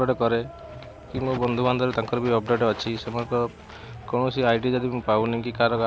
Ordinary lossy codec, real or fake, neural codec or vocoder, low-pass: none; real; none; none